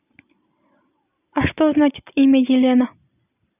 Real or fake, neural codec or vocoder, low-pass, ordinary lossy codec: real; none; 3.6 kHz; none